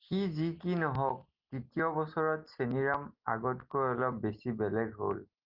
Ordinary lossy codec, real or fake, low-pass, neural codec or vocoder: Opus, 32 kbps; real; 5.4 kHz; none